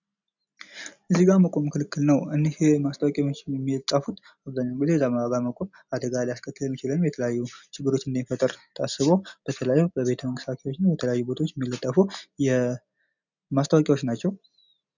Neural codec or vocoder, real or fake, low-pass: none; real; 7.2 kHz